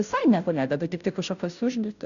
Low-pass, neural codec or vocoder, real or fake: 7.2 kHz; codec, 16 kHz, 0.5 kbps, FunCodec, trained on Chinese and English, 25 frames a second; fake